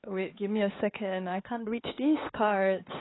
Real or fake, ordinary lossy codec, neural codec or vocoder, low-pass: fake; AAC, 16 kbps; codec, 16 kHz, 4 kbps, X-Codec, HuBERT features, trained on LibriSpeech; 7.2 kHz